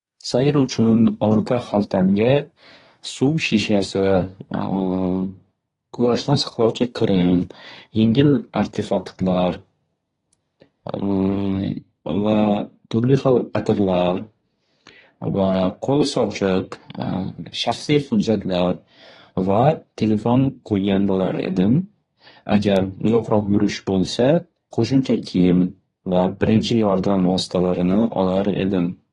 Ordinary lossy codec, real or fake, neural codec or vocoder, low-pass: AAC, 32 kbps; fake; codec, 24 kHz, 1 kbps, SNAC; 10.8 kHz